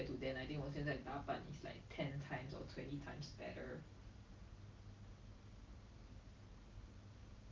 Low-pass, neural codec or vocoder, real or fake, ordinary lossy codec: 7.2 kHz; none; real; Opus, 24 kbps